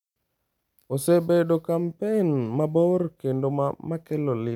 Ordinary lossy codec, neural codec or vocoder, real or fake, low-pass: none; none; real; 19.8 kHz